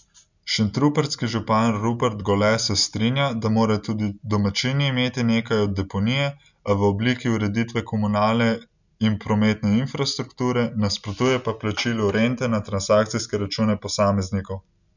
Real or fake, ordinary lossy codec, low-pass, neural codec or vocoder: real; none; 7.2 kHz; none